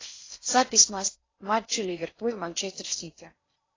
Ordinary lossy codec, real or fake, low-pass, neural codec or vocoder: AAC, 32 kbps; fake; 7.2 kHz; codec, 16 kHz in and 24 kHz out, 0.6 kbps, FocalCodec, streaming, 4096 codes